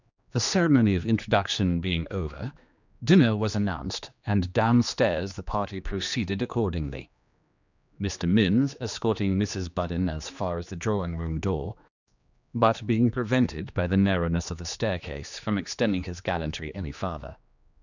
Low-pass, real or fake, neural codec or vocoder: 7.2 kHz; fake; codec, 16 kHz, 2 kbps, X-Codec, HuBERT features, trained on general audio